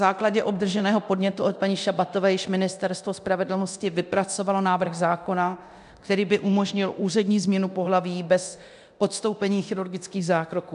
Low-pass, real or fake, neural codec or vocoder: 10.8 kHz; fake; codec, 24 kHz, 0.9 kbps, DualCodec